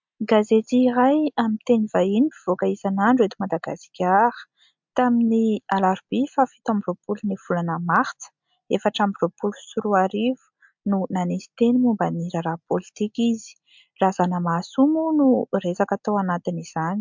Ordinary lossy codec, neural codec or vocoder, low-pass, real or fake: MP3, 64 kbps; none; 7.2 kHz; real